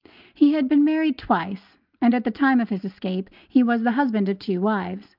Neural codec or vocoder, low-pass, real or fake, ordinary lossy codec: none; 5.4 kHz; real; Opus, 24 kbps